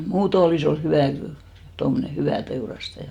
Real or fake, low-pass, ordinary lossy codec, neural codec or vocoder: real; 19.8 kHz; Opus, 64 kbps; none